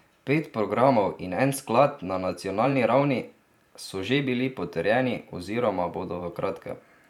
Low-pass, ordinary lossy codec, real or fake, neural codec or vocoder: 19.8 kHz; none; fake; vocoder, 44.1 kHz, 128 mel bands every 512 samples, BigVGAN v2